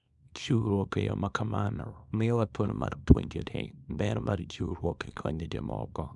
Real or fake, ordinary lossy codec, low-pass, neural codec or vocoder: fake; none; 10.8 kHz; codec, 24 kHz, 0.9 kbps, WavTokenizer, small release